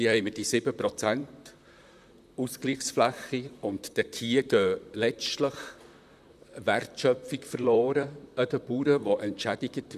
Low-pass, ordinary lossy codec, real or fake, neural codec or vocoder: 14.4 kHz; none; fake; vocoder, 44.1 kHz, 128 mel bands, Pupu-Vocoder